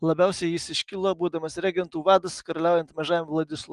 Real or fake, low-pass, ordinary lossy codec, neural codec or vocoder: real; 10.8 kHz; Opus, 24 kbps; none